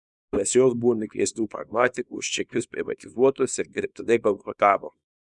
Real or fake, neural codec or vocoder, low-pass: fake; codec, 24 kHz, 0.9 kbps, WavTokenizer, small release; 10.8 kHz